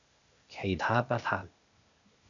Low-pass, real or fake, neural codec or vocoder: 7.2 kHz; fake; codec, 16 kHz, 0.8 kbps, ZipCodec